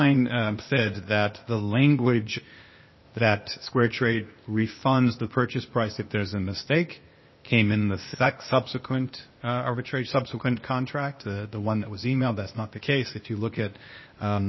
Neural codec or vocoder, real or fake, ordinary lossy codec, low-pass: codec, 16 kHz, 0.8 kbps, ZipCodec; fake; MP3, 24 kbps; 7.2 kHz